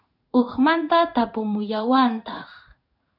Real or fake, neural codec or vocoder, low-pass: fake; codec, 16 kHz in and 24 kHz out, 1 kbps, XY-Tokenizer; 5.4 kHz